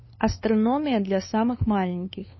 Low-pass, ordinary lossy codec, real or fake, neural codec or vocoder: 7.2 kHz; MP3, 24 kbps; fake; codec, 16 kHz, 2 kbps, FunCodec, trained on LibriTTS, 25 frames a second